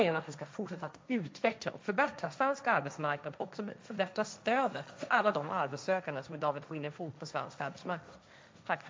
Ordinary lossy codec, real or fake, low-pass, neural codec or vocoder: none; fake; none; codec, 16 kHz, 1.1 kbps, Voila-Tokenizer